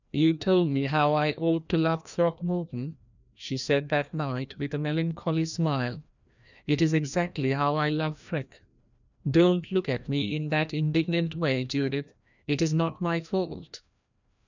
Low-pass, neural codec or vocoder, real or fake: 7.2 kHz; codec, 16 kHz, 1 kbps, FreqCodec, larger model; fake